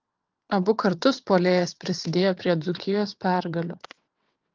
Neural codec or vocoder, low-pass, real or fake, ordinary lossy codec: vocoder, 22.05 kHz, 80 mel bands, WaveNeXt; 7.2 kHz; fake; Opus, 24 kbps